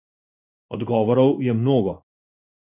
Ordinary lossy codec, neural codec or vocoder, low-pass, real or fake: none; codec, 16 kHz in and 24 kHz out, 1 kbps, XY-Tokenizer; 3.6 kHz; fake